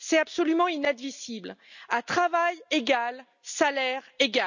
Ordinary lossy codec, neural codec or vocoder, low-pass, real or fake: none; none; 7.2 kHz; real